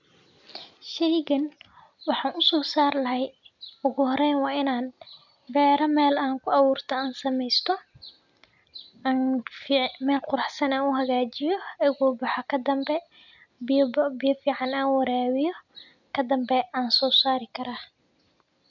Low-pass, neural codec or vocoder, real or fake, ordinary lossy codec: 7.2 kHz; none; real; none